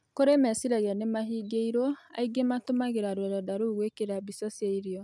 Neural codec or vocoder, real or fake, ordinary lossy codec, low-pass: none; real; none; none